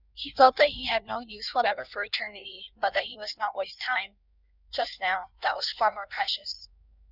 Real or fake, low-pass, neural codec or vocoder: fake; 5.4 kHz; codec, 16 kHz in and 24 kHz out, 1.1 kbps, FireRedTTS-2 codec